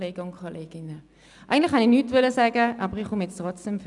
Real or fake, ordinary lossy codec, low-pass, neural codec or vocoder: real; none; 10.8 kHz; none